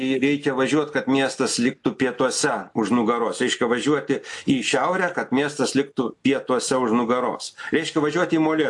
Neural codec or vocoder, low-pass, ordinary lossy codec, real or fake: none; 10.8 kHz; AAC, 64 kbps; real